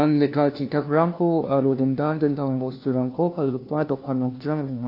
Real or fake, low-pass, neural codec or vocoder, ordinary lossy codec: fake; 5.4 kHz; codec, 16 kHz, 1 kbps, FunCodec, trained on LibriTTS, 50 frames a second; AAC, 32 kbps